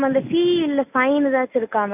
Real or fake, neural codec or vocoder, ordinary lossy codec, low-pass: real; none; none; 3.6 kHz